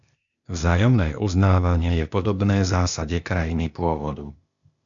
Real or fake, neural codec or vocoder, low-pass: fake; codec, 16 kHz, 0.8 kbps, ZipCodec; 7.2 kHz